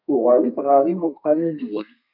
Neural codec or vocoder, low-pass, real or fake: codec, 32 kHz, 1.9 kbps, SNAC; 5.4 kHz; fake